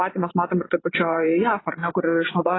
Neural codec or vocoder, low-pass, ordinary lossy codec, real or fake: vocoder, 24 kHz, 100 mel bands, Vocos; 7.2 kHz; AAC, 16 kbps; fake